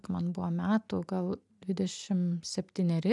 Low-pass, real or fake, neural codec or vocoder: 10.8 kHz; real; none